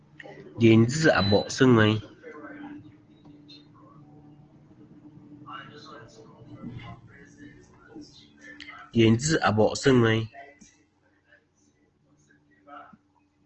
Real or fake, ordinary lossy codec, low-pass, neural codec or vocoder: real; Opus, 16 kbps; 7.2 kHz; none